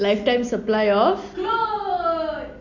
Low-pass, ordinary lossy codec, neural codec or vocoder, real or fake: 7.2 kHz; none; none; real